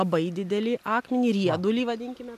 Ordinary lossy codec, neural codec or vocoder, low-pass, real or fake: MP3, 96 kbps; none; 14.4 kHz; real